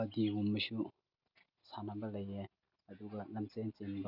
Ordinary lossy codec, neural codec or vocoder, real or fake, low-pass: none; none; real; 5.4 kHz